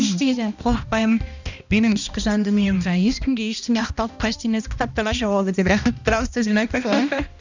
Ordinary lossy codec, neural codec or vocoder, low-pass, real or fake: none; codec, 16 kHz, 1 kbps, X-Codec, HuBERT features, trained on balanced general audio; 7.2 kHz; fake